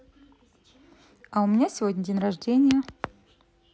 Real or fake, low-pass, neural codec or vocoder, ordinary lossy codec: real; none; none; none